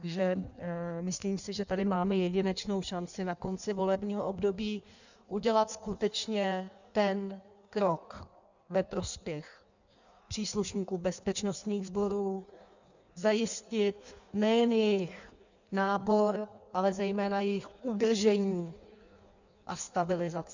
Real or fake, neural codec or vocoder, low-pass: fake; codec, 16 kHz in and 24 kHz out, 1.1 kbps, FireRedTTS-2 codec; 7.2 kHz